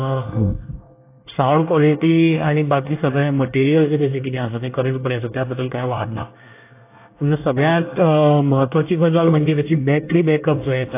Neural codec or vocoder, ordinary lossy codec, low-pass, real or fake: codec, 24 kHz, 1 kbps, SNAC; AAC, 24 kbps; 3.6 kHz; fake